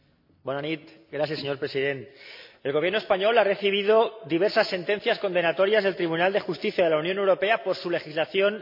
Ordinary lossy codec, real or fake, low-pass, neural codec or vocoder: MP3, 48 kbps; real; 5.4 kHz; none